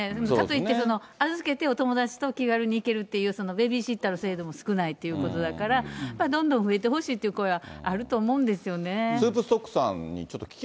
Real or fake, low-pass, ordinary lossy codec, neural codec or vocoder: real; none; none; none